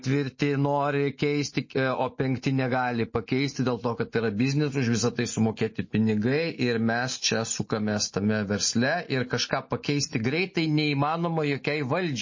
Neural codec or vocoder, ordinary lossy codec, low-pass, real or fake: none; MP3, 32 kbps; 7.2 kHz; real